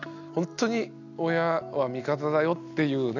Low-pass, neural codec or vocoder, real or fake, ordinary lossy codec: 7.2 kHz; none; real; none